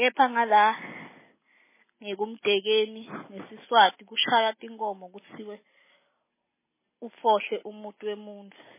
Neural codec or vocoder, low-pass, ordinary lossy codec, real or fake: none; 3.6 kHz; MP3, 16 kbps; real